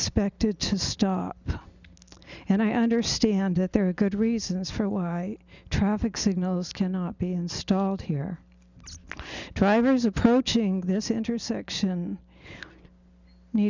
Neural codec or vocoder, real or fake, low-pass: none; real; 7.2 kHz